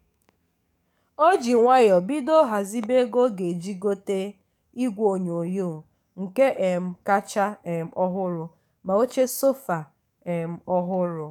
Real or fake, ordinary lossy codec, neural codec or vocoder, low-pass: fake; none; codec, 44.1 kHz, 7.8 kbps, DAC; 19.8 kHz